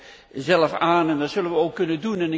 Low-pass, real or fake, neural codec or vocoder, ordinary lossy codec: none; real; none; none